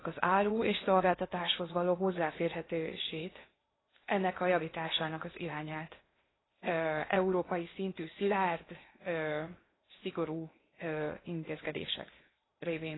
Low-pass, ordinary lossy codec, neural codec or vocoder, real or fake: 7.2 kHz; AAC, 16 kbps; codec, 16 kHz in and 24 kHz out, 0.8 kbps, FocalCodec, streaming, 65536 codes; fake